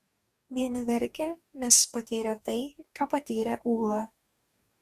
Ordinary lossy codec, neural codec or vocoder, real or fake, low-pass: Opus, 64 kbps; codec, 44.1 kHz, 2.6 kbps, DAC; fake; 14.4 kHz